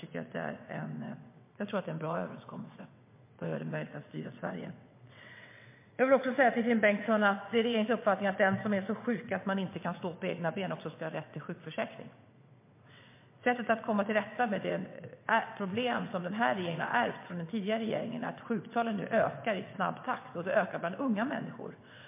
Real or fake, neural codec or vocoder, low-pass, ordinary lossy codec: fake; vocoder, 22.05 kHz, 80 mel bands, WaveNeXt; 3.6 kHz; MP3, 24 kbps